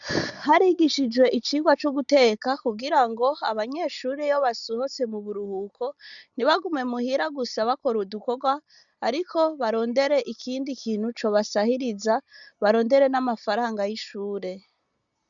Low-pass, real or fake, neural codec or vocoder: 7.2 kHz; real; none